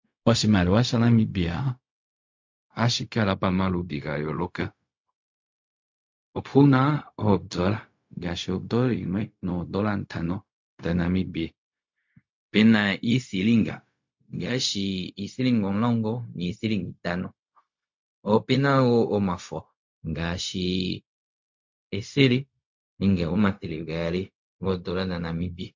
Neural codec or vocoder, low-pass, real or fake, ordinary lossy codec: codec, 16 kHz, 0.4 kbps, LongCat-Audio-Codec; 7.2 kHz; fake; MP3, 48 kbps